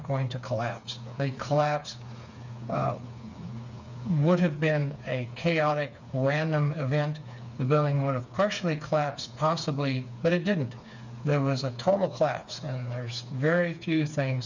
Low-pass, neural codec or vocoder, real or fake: 7.2 kHz; codec, 16 kHz, 4 kbps, FreqCodec, smaller model; fake